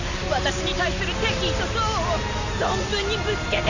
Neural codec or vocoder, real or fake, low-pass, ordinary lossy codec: none; real; 7.2 kHz; none